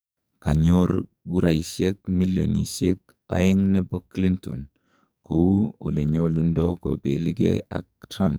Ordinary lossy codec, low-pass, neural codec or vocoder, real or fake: none; none; codec, 44.1 kHz, 2.6 kbps, SNAC; fake